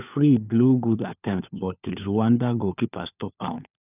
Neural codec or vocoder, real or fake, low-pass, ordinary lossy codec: codec, 16 kHz, 4 kbps, FunCodec, trained on LibriTTS, 50 frames a second; fake; 3.6 kHz; none